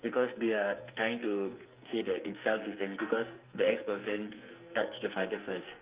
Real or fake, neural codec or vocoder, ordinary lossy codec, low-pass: fake; codec, 44.1 kHz, 2.6 kbps, SNAC; Opus, 24 kbps; 3.6 kHz